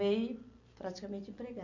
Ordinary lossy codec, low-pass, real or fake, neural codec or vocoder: none; 7.2 kHz; real; none